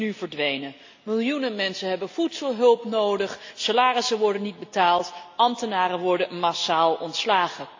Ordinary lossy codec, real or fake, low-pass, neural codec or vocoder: AAC, 48 kbps; real; 7.2 kHz; none